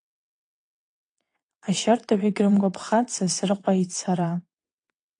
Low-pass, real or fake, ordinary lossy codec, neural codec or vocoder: 9.9 kHz; fake; AAC, 48 kbps; vocoder, 22.05 kHz, 80 mel bands, WaveNeXt